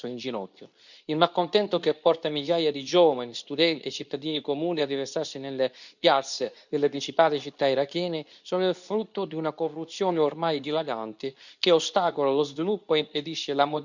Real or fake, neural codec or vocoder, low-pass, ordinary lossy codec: fake; codec, 24 kHz, 0.9 kbps, WavTokenizer, medium speech release version 2; 7.2 kHz; none